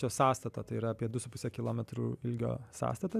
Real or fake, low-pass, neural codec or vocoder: real; 14.4 kHz; none